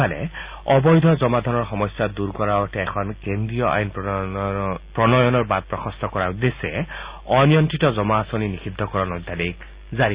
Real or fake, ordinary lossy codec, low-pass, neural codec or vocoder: real; Opus, 64 kbps; 3.6 kHz; none